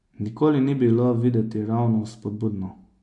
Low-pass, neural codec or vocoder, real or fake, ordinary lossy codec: 10.8 kHz; none; real; AAC, 64 kbps